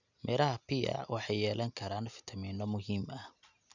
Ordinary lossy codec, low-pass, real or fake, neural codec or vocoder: none; 7.2 kHz; real; none